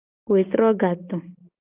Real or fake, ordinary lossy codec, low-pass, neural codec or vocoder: real; Opus, 32 kbps; 3.6 kHz; none